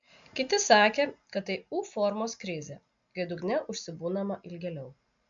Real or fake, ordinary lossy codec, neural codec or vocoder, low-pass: real; MP3, 64 kbps; none; 7.2 kHz